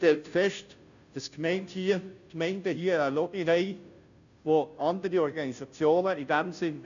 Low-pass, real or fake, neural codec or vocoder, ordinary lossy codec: 7.2 kHz; fake; codec, 16 kHz, 0.5 kbps, FunCodec, trained on Chinese and English, 25 frames a second; none